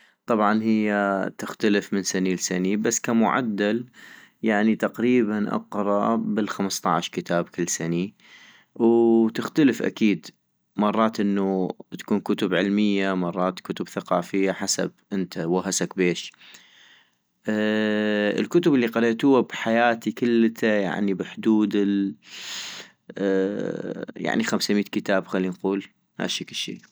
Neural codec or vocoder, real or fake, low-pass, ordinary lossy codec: none; real; none; none